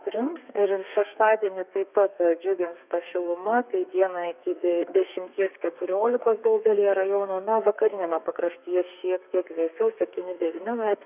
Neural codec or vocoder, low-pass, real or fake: codec, 32 kHz, 1.9 kbps, SNAC; 3.6 kHz; fake